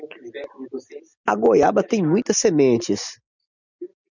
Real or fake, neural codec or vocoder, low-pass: real; none; 7.2 kHz